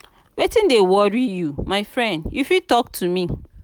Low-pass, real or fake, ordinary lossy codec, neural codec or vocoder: none; real; none; none